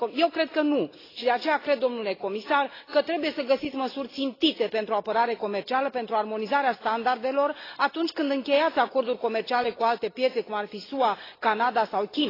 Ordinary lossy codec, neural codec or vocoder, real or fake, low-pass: AAC, 24 kbps; none; real; 5.4 kHz